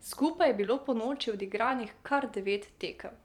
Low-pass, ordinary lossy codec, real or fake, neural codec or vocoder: 19.8 kHz; none; fake; vocoder, 44.1 kHz, 128 mel bands every 512 samples, BigVGAN v2